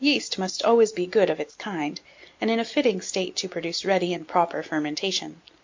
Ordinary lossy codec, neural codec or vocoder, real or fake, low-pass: MP3, 48 kbps; none; real; 7.2 kHz